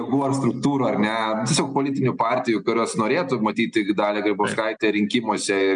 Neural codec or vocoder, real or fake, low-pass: none; real; 9.9 kHz